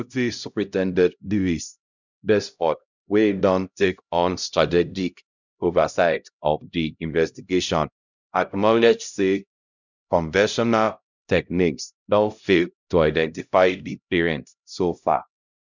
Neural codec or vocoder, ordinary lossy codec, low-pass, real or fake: codec, 16 kHz, 0.5 kbps, X-Codec, HuBERT features, trained on LibriSpeech; none; 7.2 kHz; fake